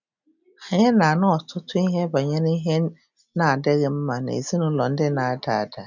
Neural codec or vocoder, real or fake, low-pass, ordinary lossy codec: none; real; 7.2 kHz; none